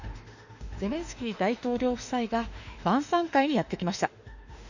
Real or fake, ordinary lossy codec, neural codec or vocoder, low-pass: fake; none; autoencoder, 48 kHz, 32 numbers a frame, DAC-VAE, trained on Japanese speech; 7.2 kHz